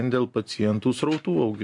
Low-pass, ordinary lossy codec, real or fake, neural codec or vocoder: 10.8 kHz; MP3, 64 kbps; real; none